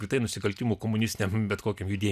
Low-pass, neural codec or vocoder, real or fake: 14.4 kHz; none; real